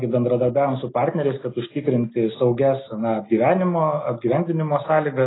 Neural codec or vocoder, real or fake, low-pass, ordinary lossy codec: none; real; 7.2 kHz; AAC, 16 kbps